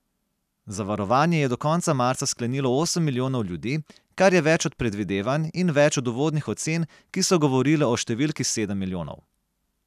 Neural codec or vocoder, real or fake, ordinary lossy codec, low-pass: none; real; none; 14.4 kHz